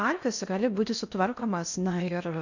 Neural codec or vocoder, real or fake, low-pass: codec, 16 kHz in and 24 kHz out, 0.6 kbps, FocalCodec, streaming, 2048 codes; fake; 7.2 kHz